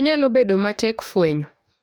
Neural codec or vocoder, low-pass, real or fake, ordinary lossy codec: codec, 44.1 kHz, 2.6 kbps, DAC; none; fake; none